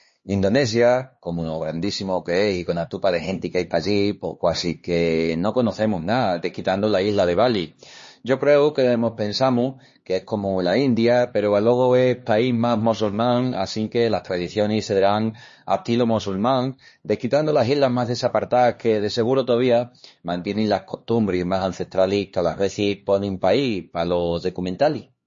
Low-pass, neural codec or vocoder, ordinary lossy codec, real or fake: 7.2 kHz; codec, 16 kHz, 2 kbps, X-Codec, HuBERT features, trained on LibriSpeech; MP3, 32 kbps; fake